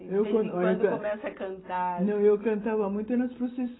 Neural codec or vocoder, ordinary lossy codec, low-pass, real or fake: none; AAC, 16 kbps; 7.2 kHz; real